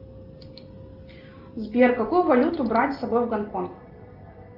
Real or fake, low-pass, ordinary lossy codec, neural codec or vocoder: real; 5.4 kHz; Opus, 24 kbps; none